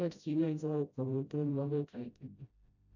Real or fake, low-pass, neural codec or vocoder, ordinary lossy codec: fake; 7.2 kHz; codec, 16 kHz, 0.5 kbps, FreqCodec, smaller model; none